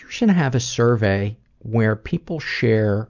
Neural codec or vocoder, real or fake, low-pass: none; real; 7.2 kHz